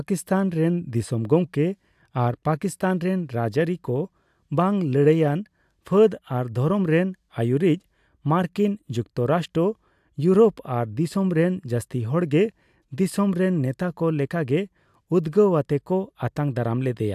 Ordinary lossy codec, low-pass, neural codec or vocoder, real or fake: AAC, 96 kbps; 14.4 kHz; none; real